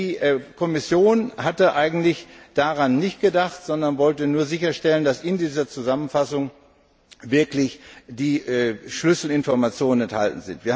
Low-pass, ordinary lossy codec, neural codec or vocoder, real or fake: none; none; none; real